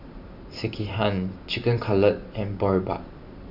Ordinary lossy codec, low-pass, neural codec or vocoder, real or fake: none; 5.4 kHz; vocoder, 44.1 kHz, 128 mel bands every 512 samples, BigVGAN v2; fake